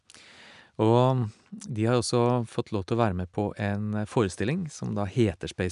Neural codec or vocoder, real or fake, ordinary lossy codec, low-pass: none; real; none; 10.8 kHz